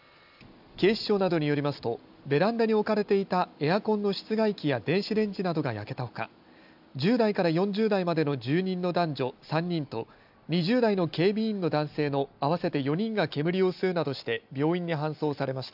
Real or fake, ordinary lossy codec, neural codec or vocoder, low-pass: real; AAC, 48 kbps; none; 5.4 kHz